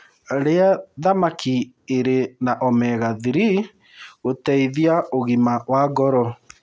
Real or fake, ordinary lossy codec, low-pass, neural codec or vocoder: real; none; none; none